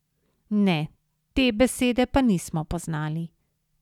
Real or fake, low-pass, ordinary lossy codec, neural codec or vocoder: real; 19.8 kHz; none; none